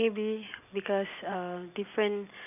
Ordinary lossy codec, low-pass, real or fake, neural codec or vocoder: none; 3.6 kHz; real; none